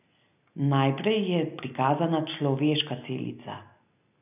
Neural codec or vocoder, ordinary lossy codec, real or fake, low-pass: none; none; real; 3.6 kHz